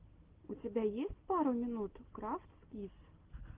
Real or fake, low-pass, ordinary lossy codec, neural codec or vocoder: real; 3.6 kHz; Opus, 16 kbps; none